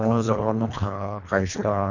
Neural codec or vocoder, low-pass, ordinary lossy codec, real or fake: codec, 24 kHz, 1.5 kbps, HILCodec; 7.2 kHz; none; fake